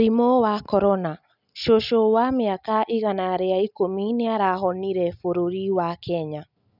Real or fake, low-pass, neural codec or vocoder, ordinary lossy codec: real; 5.4 kHz; none; none